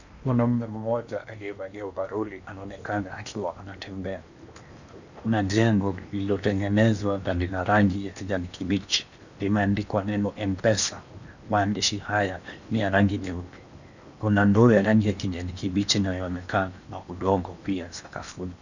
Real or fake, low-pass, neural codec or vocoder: fake; 7.2 kHz; codec, 16 kHz in and 24 kHz out, 0.8 kbps, FocalCodec, streaming, 65536 codes